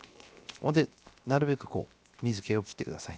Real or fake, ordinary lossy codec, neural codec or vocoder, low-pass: fake; none; codec, 16 kHz, 0.7 kbps, FocalCodec; none